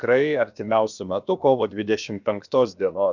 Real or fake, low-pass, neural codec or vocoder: fake; 7.2 kHz; codec, 16 kHz, about 1 kbps, DyCAST, with the encoder's durations